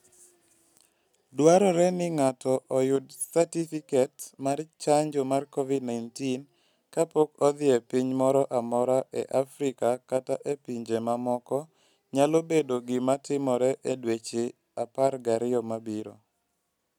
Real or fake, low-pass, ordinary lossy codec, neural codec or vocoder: real; 19.8 kHz; none; none